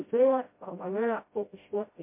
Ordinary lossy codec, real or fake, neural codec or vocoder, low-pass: MP3, 24 kbps; fake; codec, 16 kHz, 0.5 kbps, FreqCodec, smaller model; 3.6 kHz